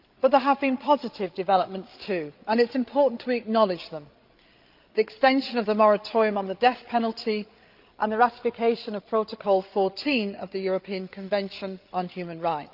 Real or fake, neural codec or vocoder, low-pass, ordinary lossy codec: fake; codec, 16 kHz, 16 kbps, FreqCodec, larger model; 5.4 kHz; Opus, 32 kbps